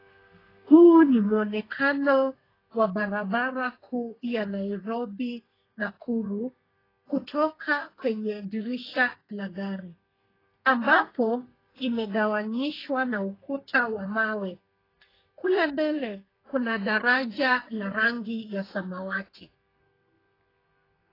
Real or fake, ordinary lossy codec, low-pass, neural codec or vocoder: fake; AAC, 24 kbps; 5.4 kHz; codec, 32 kHz, 1.9 kbps, SNAC